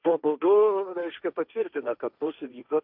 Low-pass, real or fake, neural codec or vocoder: 5.4 kHz; fake; codec, 16 kHz, 1.1 kbps, Voila-Tokenizer